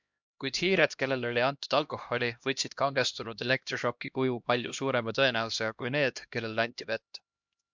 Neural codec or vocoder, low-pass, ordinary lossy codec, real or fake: codec, 16 kHz, 1 kbps, X-Codec, HuBERT features, trained on LibriSpeech; 7.2 kHz; MP3, 64 kbps; fake